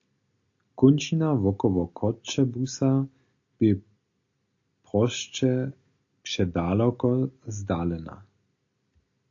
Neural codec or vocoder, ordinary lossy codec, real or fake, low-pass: none; AAC, 48 kbps; real; 7.2 kHz